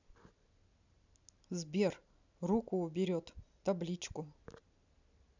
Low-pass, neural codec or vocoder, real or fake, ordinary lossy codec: 7.2 kHz; none; real; none